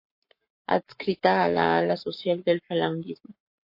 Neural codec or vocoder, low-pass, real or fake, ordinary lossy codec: codec, 44.1 kHz, 7.8 kbps, Pupu-Codec; 5.4 kHz; fake; MP3, 32 kbps